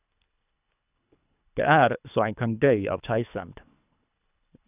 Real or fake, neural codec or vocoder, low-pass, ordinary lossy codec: fake; codec, 24 kHz, 3 kbps, HILCodec; 3.6 kHz; none